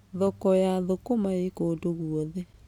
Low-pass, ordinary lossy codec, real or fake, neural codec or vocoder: 19.8 kHz; none; real; none